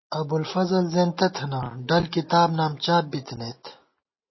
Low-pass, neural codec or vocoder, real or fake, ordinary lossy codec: 7.2 kHz; none; real; MP3, 24 kbps